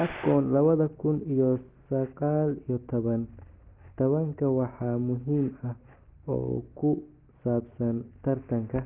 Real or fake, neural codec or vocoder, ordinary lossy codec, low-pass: real; none; Opus, 16 kbps; 3.6 kHz